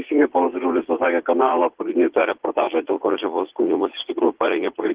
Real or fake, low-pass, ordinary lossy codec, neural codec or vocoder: fake; 3.6 kHz; Opus, 16 kbps; vocoder, 44.1 kHz, 80 mel bands, Vocos